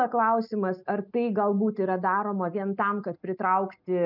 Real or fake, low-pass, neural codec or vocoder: real; 5.4 kHz; none